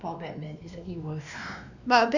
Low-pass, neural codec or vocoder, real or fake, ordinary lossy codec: 7.2 kHz; codec, 16 kHz, 2 kbps, X-Codec, WavLM features, trained on Multilingual LibriSpeech; fake; none